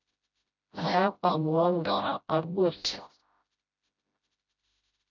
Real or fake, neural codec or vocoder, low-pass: fake; codec, 16 kHz, 0.5 kbps, FreqCodec, smaller model; 7.2 kHz